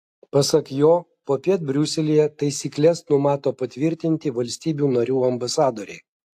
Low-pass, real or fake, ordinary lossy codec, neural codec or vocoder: 14.4 kHz; real; AAC, 64 kbps; none